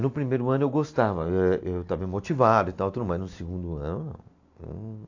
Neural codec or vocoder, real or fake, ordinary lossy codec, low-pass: none; real; AAC, 48 kbps; 7.2 kHz